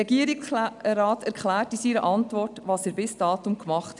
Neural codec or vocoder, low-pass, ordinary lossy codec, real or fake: none; 10.8 kHz; none; real